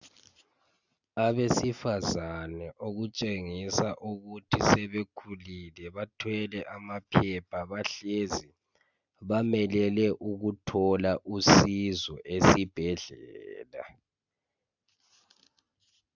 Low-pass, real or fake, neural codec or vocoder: 7.2 kHz; real; none